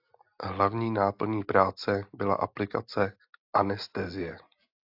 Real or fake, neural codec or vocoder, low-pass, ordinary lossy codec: real; none; 5.4 kHz; AAC, 48 kbps